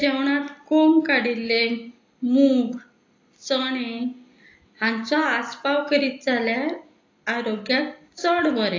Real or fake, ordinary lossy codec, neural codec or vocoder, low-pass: real; AAC, 48 kbps; none; 7.2 kHz